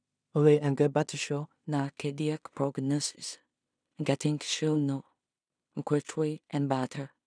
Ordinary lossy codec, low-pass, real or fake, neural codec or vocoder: none; 9.9 kHz; fake; codec, 16 kHz in and 24 kHz out, 0.4 kbps, LongCat-Audio-Codec, two codebook decoder